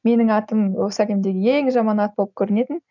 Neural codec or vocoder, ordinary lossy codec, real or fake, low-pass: none; none; real; 7.2 kHz